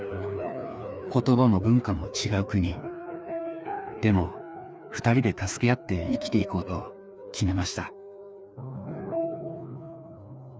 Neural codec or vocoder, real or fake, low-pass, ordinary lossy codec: codec, 16 kHz, 2 kbps, FreqCodec, larger model; fake; none; none